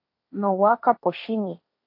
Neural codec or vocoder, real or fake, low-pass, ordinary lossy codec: codec, 16 kHz, 1.1 kbps, Voila-Tokenizer; fake; 5.4 kHz; MP3, 32 kbps